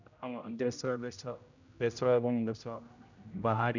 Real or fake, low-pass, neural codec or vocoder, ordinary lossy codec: fake; 7.2 kHz; codec, 16 kHz, 0.5 kbps, X-Codec, HuBERT features, trained on general audio; none